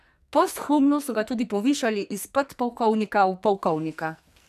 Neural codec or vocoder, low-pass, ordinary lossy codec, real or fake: codec, 44.1 kHz, 2.6 kbps, SNAC; 14.4 kHz; none; fake